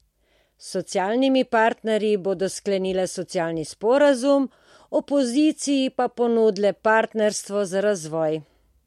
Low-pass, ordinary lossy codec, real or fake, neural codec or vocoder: 19.8 kHz; MP3, 64 kbps; real; none